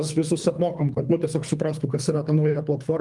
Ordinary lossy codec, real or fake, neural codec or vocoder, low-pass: Opus, 32 kbps; fake; codec, 24 kHz, 3 kbps, HILCodec; 10.8 kHz